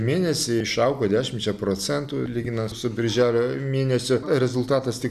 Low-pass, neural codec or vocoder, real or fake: 14.4 kHz; none; real